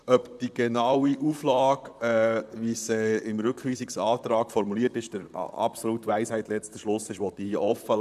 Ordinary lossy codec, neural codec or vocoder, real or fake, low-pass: none; vocoder, 44.1 kHz, 128 mel bands, Pupu-Vocoder; fake; 14.4 kHz